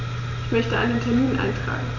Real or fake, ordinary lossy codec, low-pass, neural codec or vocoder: real; none; 7.2 kHz; none